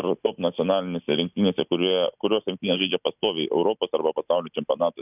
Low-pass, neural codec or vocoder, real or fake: 3.6 kHz; none; real